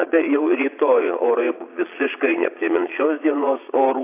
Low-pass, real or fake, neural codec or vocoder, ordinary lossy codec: 3.6 kHz; fake; vocoder, 22.05 kHz, 80 mel bands, Vocos; AAC, 24 kbps